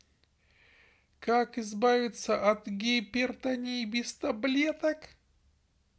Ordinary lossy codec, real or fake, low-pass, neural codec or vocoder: none; real; none; none